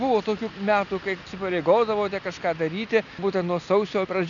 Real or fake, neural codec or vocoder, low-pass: real; none; 7.2 kHz